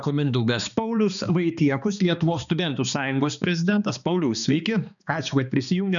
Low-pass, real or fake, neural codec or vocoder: 7.2 kHz; fake; codec, 16 kHz, 2 kbps, X-Codec, HuBERT features, trained on balanced general audio